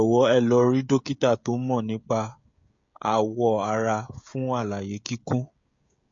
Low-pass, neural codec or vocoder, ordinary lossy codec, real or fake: 7.2 kHz; codec, 16 kHz, 16 kbps, FreqCodec, smaller model; MP3, 48 kbps; fake